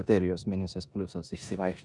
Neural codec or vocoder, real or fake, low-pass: codec, 16 kHz in and 24 kHz out, 0.9 kbps, LongCat-Audio-Codec, four codebook decoder; fake; 10.8 kHz